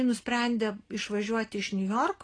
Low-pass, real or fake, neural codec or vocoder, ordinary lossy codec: 9.9 kHz; real; none; AAC, 32 kbps